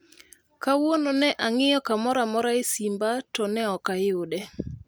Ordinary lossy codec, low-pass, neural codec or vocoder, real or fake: none; none; none; real